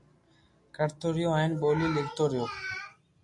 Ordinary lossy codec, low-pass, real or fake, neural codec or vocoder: MP3, 64 kbps; 10.8 kHz; real; none